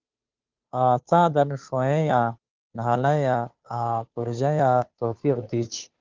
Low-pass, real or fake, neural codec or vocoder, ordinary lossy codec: 7.2 kHz; fake; codec, 16 kHz, 2 kbps, FunCodec, trained on Chinese and English, 25 frames a second; Opus, 32 kbps